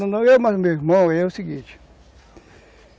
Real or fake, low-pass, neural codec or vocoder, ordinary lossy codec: real; none; none; none